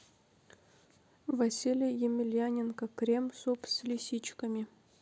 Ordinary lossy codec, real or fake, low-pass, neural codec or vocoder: none; real; none; none